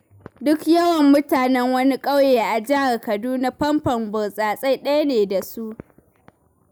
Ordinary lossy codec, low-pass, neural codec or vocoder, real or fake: none; none; none; real